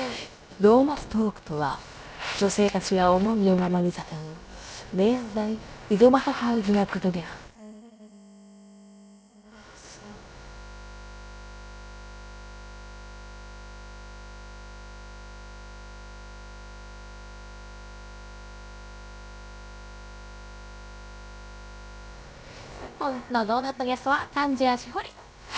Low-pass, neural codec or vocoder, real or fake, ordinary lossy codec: none; codec, 16 kHz, about 1 kbps, DyCAST, with the encoder's durations; fake; none